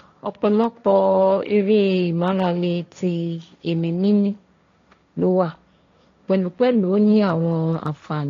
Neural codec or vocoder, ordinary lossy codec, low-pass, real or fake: codec, 16 kHz, 1.1 kbps, Voila-Tokenizer; AAC, 48 kbps; 7.2 kHz; fake